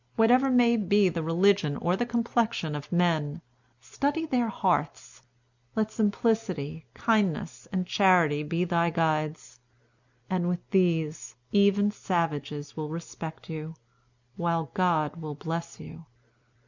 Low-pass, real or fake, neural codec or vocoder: 7.2 kHz; real; none